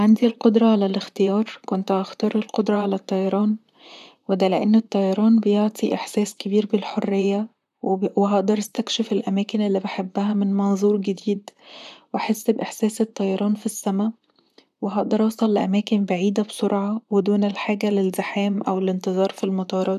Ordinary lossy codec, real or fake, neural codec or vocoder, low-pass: none; fake; vocoder, 44.1 kHz, 128 mel bands, Pupu-Vocoder; 14.4 kHz